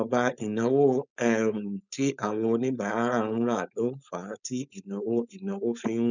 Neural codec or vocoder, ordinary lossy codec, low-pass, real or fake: codec, 16 kHz, 4.8 kbps, FACodec; none; 7.2 kHz; fake